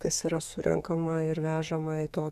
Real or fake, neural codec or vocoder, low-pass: fake; codec, 32 kHz, 1.9 kbps, SNAC; 14.4 kHz